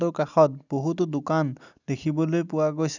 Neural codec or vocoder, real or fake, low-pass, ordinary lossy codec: none; real; 7.2 kHz; none